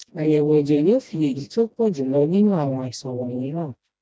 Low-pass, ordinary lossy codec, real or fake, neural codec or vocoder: none; none; fake; codec, 16 kHz, 1 kbps, FreqCodec, smaller model